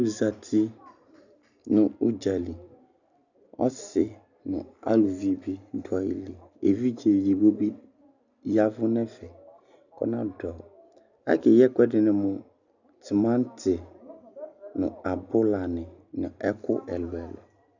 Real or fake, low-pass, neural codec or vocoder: real; 7.2 kHz; none